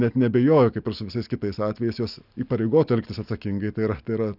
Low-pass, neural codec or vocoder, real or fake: 5.4 kHz; none; real